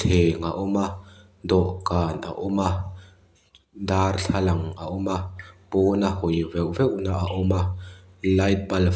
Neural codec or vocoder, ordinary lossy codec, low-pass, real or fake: none; none; none; real